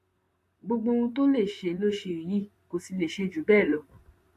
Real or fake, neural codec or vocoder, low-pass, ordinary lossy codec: fake; vocoder, 44.1 kHz, 128 mel bands, Pupu-Vocoder; 14.4 kHz; AAC, 64 kbps